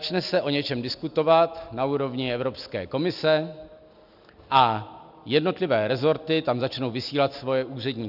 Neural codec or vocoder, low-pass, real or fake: none; 5.4 kHz; real